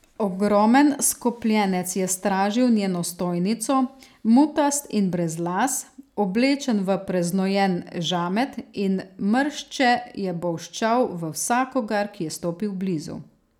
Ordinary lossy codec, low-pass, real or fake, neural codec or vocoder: none; 19.8 kHz; real; none